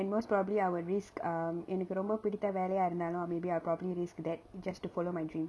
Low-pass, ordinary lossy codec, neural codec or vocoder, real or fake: none; none; none; real